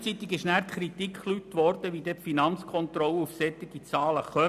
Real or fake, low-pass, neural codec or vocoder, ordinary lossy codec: real; 14.4 kHz; none; none